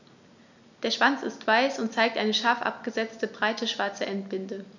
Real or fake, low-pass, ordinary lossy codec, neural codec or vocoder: real; 7.2 kHz; none; none